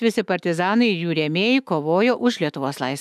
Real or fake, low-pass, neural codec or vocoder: fake; 14.4 kHz; autoencoder, 48 kHz, 128 numbers a frame, DAC-VAE, trained on Japanese speech